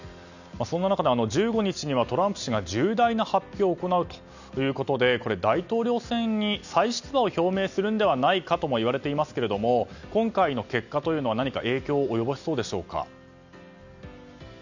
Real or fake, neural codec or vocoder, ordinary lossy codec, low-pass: real; none; none; 7.2 kHz